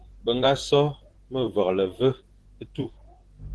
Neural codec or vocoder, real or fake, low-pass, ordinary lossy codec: vocoder, 44.1 kHz, 128 mel bands, Pupu-Vocoder; fake; 10.8 kHz; Opus, 16 kbps